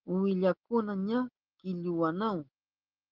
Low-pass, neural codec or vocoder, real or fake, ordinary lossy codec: 5.4 kHz; none; real; Opus, 16 kbps